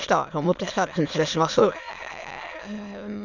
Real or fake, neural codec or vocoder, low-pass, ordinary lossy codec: fake; autoencoder, 22.05 kHz, a latent of 192 numbers a frame, VITS, trained on many speakers; 7.2 kHz; none